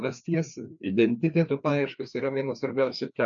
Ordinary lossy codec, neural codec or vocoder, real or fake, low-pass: MP3, 96 kbps; codec, 16 kHz, 2 kbps, FreqCodec, larger model; fake; 7.2 kHz